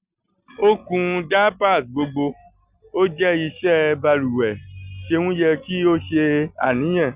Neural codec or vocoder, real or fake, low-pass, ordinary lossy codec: none; real; 3.6 kHz; Opus, 64 kbps